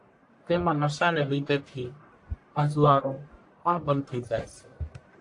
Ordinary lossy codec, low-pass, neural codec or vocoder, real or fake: AAC, 64 kbps; 10.8 kHz; codec, 44.1 kHz, 1.7 kbps, Pupu-Codec; fake